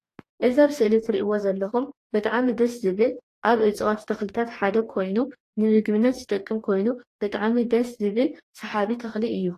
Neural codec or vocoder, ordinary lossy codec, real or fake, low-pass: codec, 44.1 kHz, 2.6 kbps, DAC; AAC, 48 kbps; fake; 14.4 kHz